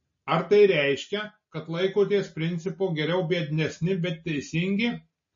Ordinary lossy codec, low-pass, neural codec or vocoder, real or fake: MP3, 32 kbps; 7.2 kHz; none; real